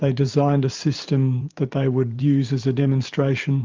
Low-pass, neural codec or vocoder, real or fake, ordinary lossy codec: 7.2 kHz; vocoder, 44.1 kHz, 128 mel bands every 512 samples, BigVGAN v2; fake; Opus, 32 kbps